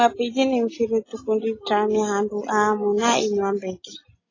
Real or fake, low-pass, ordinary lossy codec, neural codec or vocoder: real; 7.2 kHz; AAC, 32 kbps; none